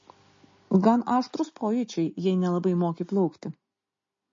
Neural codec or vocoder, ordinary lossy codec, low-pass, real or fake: none; MP3, 32 kbps; 7.2 kHz; real